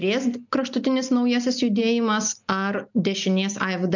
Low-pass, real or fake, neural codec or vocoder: 7.2 kHz; real; none